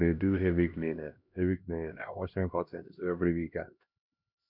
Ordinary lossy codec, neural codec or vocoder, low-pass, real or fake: none; codec, 16 kHz, 1 kbps, X-Codec, HuBERT features, trained on LibriSpeech; 5.4 kHz; fake